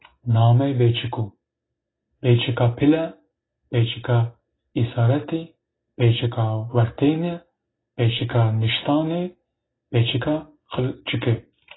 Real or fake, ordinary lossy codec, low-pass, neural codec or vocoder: real; AAC, 16 kbps; 7.2 kHz; none